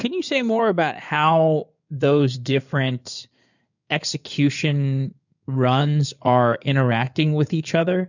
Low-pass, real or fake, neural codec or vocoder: 7.2 kHz; fake; codec, 16 kHz in and 24 kHz out, 2.2 kbps, FireRedTTS-2 codec